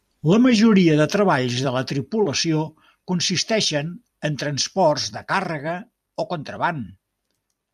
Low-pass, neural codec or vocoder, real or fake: 14.4 kHz; vocoder, 44.1 kHz, 128 mel bands every 256 samples, BigVGAN v2; fake